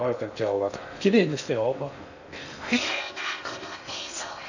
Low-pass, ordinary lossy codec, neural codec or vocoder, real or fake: 7.2 kHz; none; codec, 16 kHz in and 24 kHz out, 0.6 kbps, FocalCodec, streaming, 2048 codes; fake